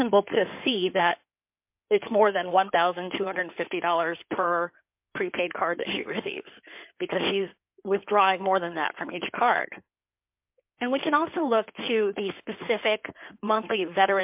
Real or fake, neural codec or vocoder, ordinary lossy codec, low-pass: fake; codec, 16 kHz in and 24 kHz out, 2.2 kbps, FireRedTTS-2 codec; MP3, 32 kbps; 3.6 kHz